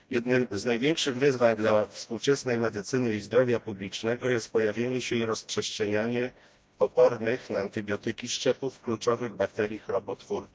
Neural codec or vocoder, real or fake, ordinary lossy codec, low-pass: codec, 16 kHz, 1 kbps, FreqCodec, smaller model; fake; none; none